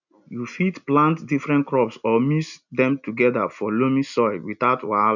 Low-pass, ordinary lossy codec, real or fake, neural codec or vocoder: 7.2 kHz; none; real; none